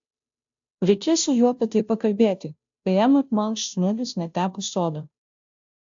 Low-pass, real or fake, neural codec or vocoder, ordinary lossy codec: 7.2 kHz; fake; codec, 16 kHz, 0.5 kbps, FunCodec, trained on Chinese and English, 25 frames a second; AAC, 64 kbps